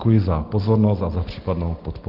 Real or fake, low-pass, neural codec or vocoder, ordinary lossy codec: real; 5.4 kHz; none; Opus, 16 kbps